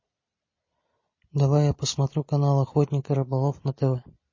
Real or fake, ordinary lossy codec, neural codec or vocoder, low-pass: real; MP3, 32 kbps; none; 7.2 kHz